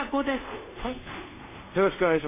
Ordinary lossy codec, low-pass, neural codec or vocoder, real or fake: MP3, 16 kbps; 3.6 kHz; codec, 24 kHz, 0.5 kbps, DualCodec; fake